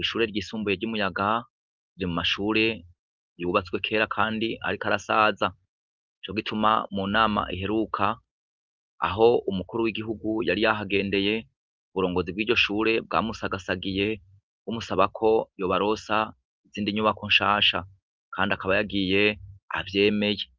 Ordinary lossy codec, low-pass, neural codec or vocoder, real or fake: Opus, 32 kbps; 7.2 kHz; none; real